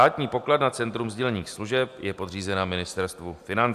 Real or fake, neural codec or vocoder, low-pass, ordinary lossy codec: real; none; 14.4 kHz; AAC, 96 kbps